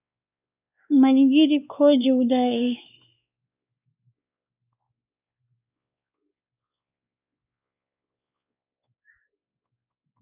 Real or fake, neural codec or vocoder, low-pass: fake; codec, 16 kHz, 2 kbps, X-Codec, WavLM features, trained on Multilingual LibriSpeech; 3.6 kHz